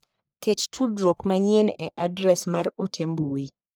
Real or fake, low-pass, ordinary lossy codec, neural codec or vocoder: fake; none; none; codec, 44.1 kHz, 1.7 kbps, Pupu-Codec